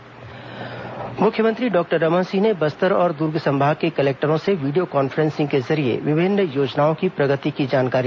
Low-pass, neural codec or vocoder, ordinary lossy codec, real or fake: none; none; none; real